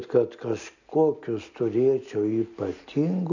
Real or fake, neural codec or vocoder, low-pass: real; none; 7.2 kHz